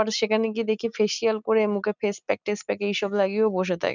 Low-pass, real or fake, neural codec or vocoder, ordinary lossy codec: 7.2 kHz; real; none; none